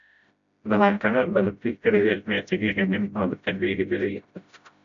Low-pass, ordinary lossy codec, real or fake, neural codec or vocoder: 7.2 kHz; MP3, 96 kbps; fake; codec, 16 kHz, 0.5 kbps, FreqCodec, smaller model